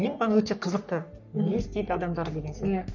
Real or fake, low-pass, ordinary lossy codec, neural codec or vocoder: fake; 7.2 kHz; none; codec, 44.1 kHz, 3.4 kbps, Pupu-Codec